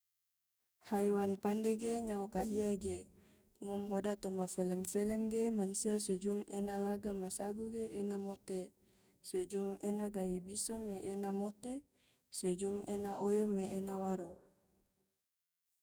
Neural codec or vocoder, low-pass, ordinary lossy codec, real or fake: codec, 44.1 kHz, 2.6 kbps, DAC; none; none; fake